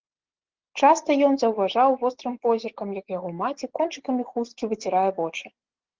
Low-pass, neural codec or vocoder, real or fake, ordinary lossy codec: 7.2 kHz; none; real; Opus, 16 kbps